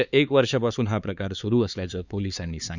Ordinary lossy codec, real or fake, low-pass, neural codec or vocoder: none; fake; 7.2 kHz; codec, 16 kHz, 4 kbps, X-Codec, HuBERT features, trained on LibriSpeech